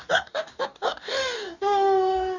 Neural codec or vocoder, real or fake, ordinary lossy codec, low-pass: codec, 44.1 kHz, 2.6 kbps, DAC; fake; none; 7.2 kHz